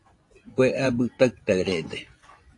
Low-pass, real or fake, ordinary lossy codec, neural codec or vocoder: 10.8 kHz; fake; AAC, 48 kbps; vocoder, 24 kHz, 100 mel bands, Vocos